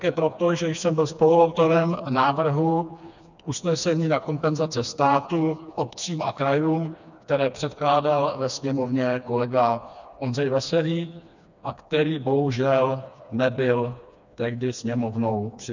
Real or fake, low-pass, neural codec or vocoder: fake; 7.2 kHz; codec, 16 kHz, 2 kbps, FreqCodec, smaller model